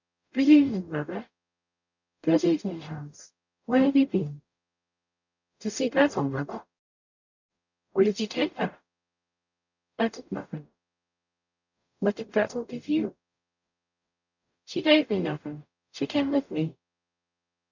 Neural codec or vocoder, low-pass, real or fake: codec, 44.1 kHz, 0.9 kbps, DAC; 7.2 kHz; fake